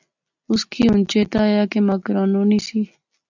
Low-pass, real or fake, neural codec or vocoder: 7.2 kHz; real; none